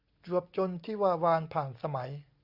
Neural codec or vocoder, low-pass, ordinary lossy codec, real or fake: none; 5.4 kHz; Opus, 64 kbps; real